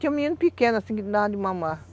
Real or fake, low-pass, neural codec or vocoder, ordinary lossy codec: real; none; none; none